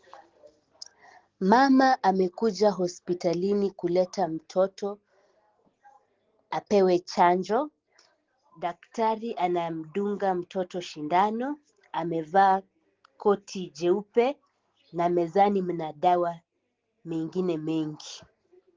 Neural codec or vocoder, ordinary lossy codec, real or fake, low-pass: none; Opus, 16 kbps; real; 7.2 kHz